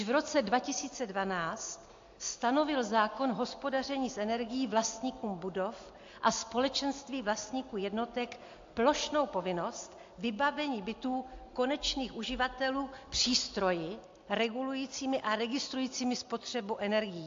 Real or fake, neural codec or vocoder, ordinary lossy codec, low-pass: real; none; AAC, 48 kbps; 7.2 kHz